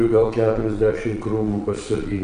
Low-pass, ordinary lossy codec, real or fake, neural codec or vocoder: 9.9 kHz; AAC, 64 kbps; fake; vocoder, 22.05 kHz, 80 mel bands, Vocos